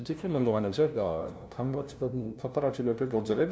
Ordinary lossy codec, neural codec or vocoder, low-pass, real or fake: none; codec, 16 kHz, 0.5 kbps, FunCodec, trained on LibriTTS, 25 frames a second; none; fake